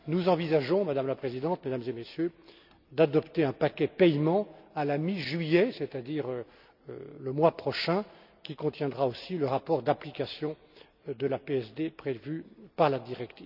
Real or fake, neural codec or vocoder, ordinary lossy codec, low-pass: real; none; none; 5.4 kHz